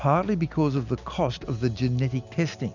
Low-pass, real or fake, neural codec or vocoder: 7.2 kHz; real; none